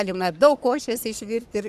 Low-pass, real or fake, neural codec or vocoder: 14.4 kHz; fake; codec, 44.1 kHz, 3.4 kbps, Pupu-Codec